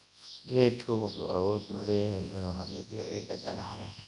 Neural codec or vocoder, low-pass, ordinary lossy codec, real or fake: codec, 24 kHz, 0.9 kbps, WavTokenizer, large speech release; 10.8 kHz; none; fake